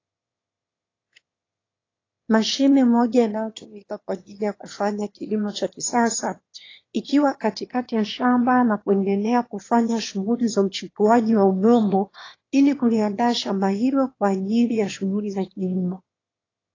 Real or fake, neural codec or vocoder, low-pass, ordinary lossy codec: fake; autoencoder, 22.05 kHz, a latent of 192 numbers a frame, VITS, trained on one speaker; 7.2 kHz; AAC, 32 kbps